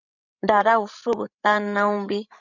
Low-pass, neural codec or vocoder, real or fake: 7.2 kHz; codec, 16 kHz, 16 kbps, FreqCodec, larger model; fake